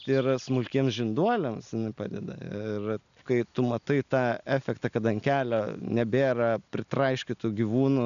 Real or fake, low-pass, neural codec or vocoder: real; 7.2 kHz; none